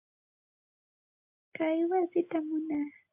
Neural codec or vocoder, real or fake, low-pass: none; real; 3.6 kHz